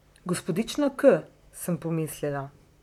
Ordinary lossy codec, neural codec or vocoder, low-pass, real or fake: none; vocoder, 44.1 kHz, 128 mel bands, Pupu-Vocoder; 19.8 kHz; fake